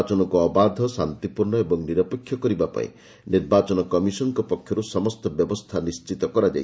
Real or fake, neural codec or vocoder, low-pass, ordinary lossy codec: real; none; none; none